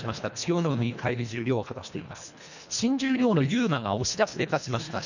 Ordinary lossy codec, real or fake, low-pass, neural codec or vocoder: none; fake; 7.2 kHz; codec, 24 kHz, 1.5 kbps, HILCodec